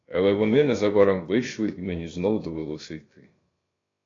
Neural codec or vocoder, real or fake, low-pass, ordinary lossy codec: codec, 16 kHz, about 1 kbps, DyCAST, with the encoder's durations; fake; 7.2 kHz; AAC, 32 kbps